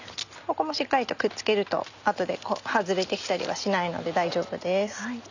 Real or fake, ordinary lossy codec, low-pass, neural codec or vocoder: real; none; 7.2 kHz; none